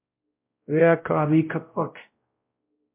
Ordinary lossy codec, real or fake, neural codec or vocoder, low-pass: MP3, 32 kbps; fake; codec, 16 kHz, 0.5 kbps, X-Codec, WavLM features, trained on Multilingual LibriSpeech; 3.6 kHz